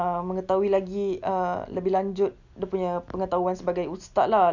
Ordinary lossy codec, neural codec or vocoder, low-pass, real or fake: none; none; 7.2 kHz; real